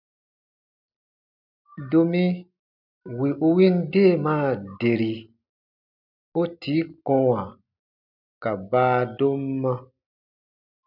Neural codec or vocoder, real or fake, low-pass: none; real; 5.4 kHz